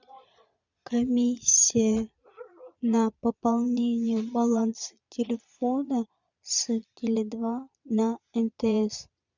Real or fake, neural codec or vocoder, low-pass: fake; vocoder, 22.05 kHz, 80 mel bands, Vocos; 7.2 kHz